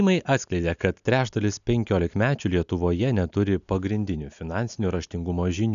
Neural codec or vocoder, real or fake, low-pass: none; real; 7.2 kHz